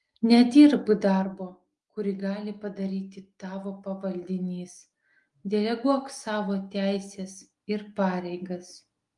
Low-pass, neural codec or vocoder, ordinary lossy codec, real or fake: 10.8 kHz; none; Opus, 32 kbps; real